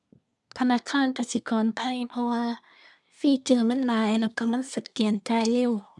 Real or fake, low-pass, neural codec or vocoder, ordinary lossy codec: fake; 10.8 kHz; codec, 24 kHz, 1 kbps, SNAC; none